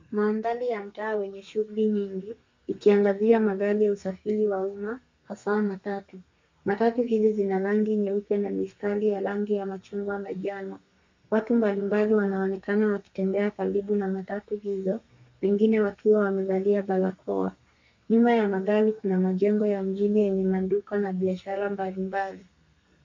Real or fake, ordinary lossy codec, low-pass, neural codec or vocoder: fake; MP3, 48 kbps; 7.2 kHz; codec, 32 kHz, 1.9 kbps, SNAC